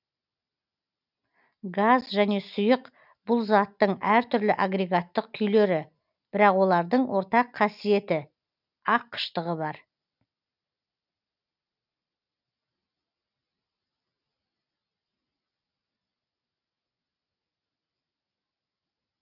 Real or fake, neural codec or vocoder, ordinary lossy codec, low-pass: real; none; none; 5.4 kHz